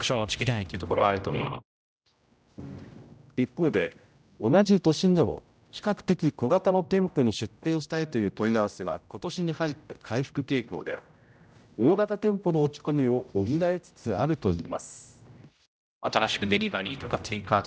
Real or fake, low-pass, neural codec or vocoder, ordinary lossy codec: fake; none; codec, 16 kHz, 0.5 kbps, X-Codec, HuBERT features, trained on general audio; none